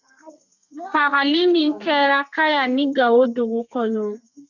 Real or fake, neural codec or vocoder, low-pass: fake; codec, 32 kHz, 1.9 kbps, SNAC; 7.2 kHz